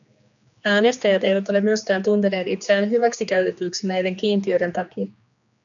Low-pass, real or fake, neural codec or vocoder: 7.2 kHz; fake; codec, 16 kHz, 2 kbps, X-Codec, HuBERT features, trained on general audio